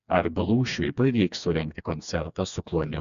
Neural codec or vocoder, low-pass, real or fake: codec, 16 kHz, 2 kbps, FreqCodec, smaller model; 7.2 kHz; fake